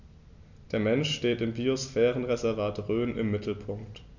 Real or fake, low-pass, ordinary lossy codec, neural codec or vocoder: real; 7.2 kHz; none; none